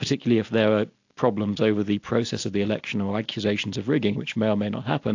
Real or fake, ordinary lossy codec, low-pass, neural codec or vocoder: real; AAC, 48 kbps; 7.2 kHz; none